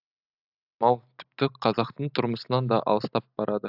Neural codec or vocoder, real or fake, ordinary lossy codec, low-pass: none; real; AAC, 48 kbps; 5.4 kHz